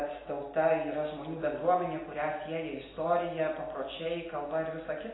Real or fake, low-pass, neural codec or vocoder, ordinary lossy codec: real; 7.2 kHz; none; AAC, 16 kbps